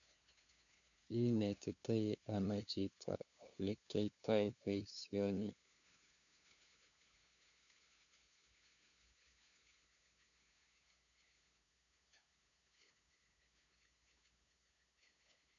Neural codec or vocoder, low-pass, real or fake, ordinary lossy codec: codec, 16 kHz, 2 kbps, FunCodec, trained on LibriTTS, 25 frames a second; 7.2 kHz; fake; none